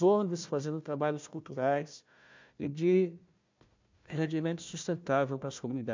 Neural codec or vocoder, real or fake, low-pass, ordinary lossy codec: codec, 16 kHz, 1 kbps, FunCodec, trained on Chinese and English, 50 frames a second; fake; 7.2 kHz; MP3, 64 kbps